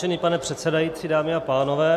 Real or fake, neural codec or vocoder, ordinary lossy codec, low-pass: real; none; MP3, 96 kbps; 14.4 kHz